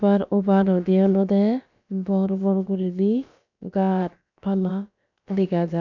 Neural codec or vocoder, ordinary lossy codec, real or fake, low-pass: codec, 16 kHz, about 1 kbps, DyCAST, with the encoder's durations; none; fake; 7.2 kHz